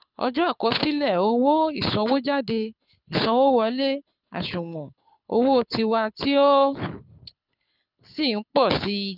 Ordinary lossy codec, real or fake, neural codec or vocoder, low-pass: none; fake; codec, 24 kHz, 6 kbps, HILCodec; 5.4 kHz